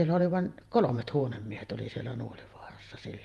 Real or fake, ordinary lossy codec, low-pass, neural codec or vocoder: fake; Opus, 32 kbps; 14.4 kHz; vocoder, 48 kHz, 128 mel bands, Vocos